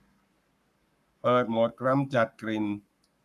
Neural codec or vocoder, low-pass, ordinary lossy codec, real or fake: codec, 44.1 kHz, 7.8 kbps, Pupu-Codec; 14.4 kHz; none; fake